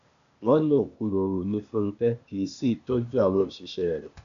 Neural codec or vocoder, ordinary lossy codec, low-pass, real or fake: codec, 16 kHz, 0.8 kbps, ZipCodec; none; 7.2 kHz; fake